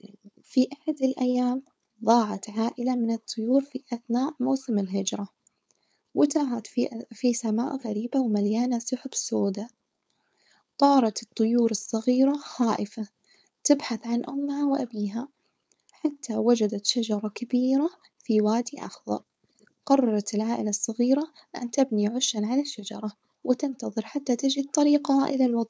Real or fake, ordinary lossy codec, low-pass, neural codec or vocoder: fake; none; none; codec, 16 kHz, 4.8 kbps, FACodec